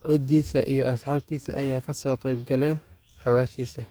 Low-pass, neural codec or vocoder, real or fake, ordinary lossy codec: none; codec, 44.1 kHz, 2.6 kbps, DAC; fake; none